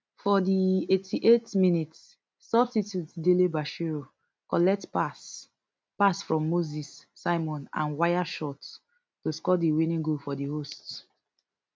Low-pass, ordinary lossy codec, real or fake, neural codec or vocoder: none; none; real; none